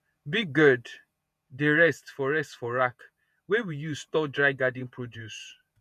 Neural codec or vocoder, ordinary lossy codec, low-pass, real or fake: vocoder, 48 kHz, 128 mel bands, Vocos; none; 14.4 kHz; fake